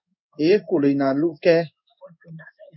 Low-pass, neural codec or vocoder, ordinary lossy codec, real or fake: 7.2 kHz; codec, 16 kHz in and 24 kHz out, 1 kbps, XY-Tokenizer; MP3, 64 kbps; fake